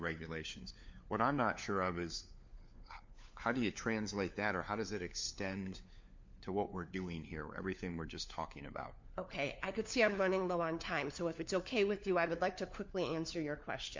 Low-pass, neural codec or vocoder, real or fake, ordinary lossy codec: 7.2 kHz; codec, 16 kHz, 4 kbps, FunCodec, trained on LibriTTS, 50 frames a second; fake; MP3, 48 kbps